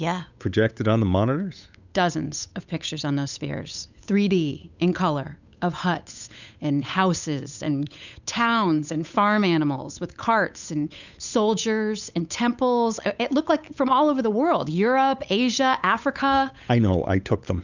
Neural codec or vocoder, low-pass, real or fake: codec, 16 kHz, 8 kbps, FunCodec, trained on Chinese and English, 25 frames a second; 7.2 kHz; fake